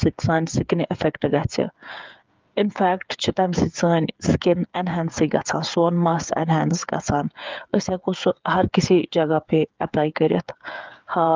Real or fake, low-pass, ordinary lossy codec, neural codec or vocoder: fake; 7.2 kHz; Opus, 24 kbps; codec, 16 kHz, 8 kbps, FreqCodec, smaller model